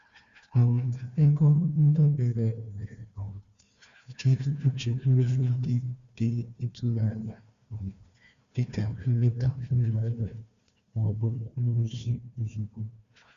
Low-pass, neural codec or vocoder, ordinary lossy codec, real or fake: 7.2 kHz; codec, 16 kHz, 1 kbps, FunCodec, trained on Chinese and English, 50 frames a second; Opus, 64 kbps; fake